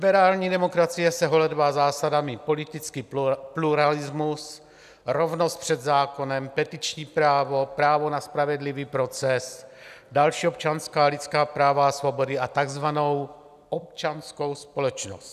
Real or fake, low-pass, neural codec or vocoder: real; 14.4 kHz; none